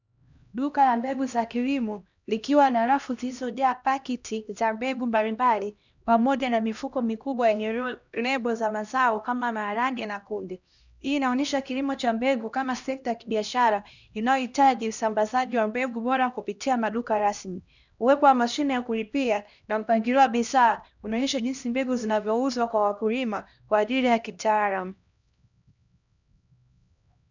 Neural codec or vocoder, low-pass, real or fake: codec, 16 kHz, 1 kbps, X-Codec, HuBERT features, trained on LibriSpeech; 7.2 kHz; fake